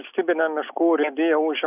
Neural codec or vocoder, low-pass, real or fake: none; 3.6 kHz; real